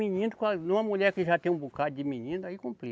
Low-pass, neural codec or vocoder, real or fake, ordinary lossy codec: none; none; real; none